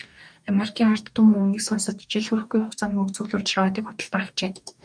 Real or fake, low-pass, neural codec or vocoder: fake; 9.9 kHz; codec, 44.1 kHz, 2.6 kbps, DAC